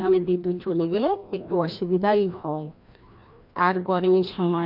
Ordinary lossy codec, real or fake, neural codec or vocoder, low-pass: MP3, 48 kbps; fake; codec, 16 kHz, 1 kbps, FreqCodec, larger model; 5.4 kHz